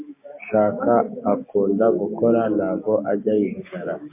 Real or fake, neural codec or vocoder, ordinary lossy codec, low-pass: fake; codec, 16 kHz, 6 kbps, DAC; MP3, 24 kbps; 3.6 kHz